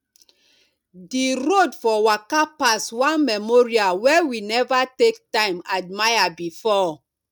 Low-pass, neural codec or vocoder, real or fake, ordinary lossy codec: 19.8 kHz; none; real; none